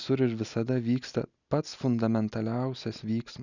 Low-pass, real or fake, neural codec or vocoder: 7.2 kHz; real; none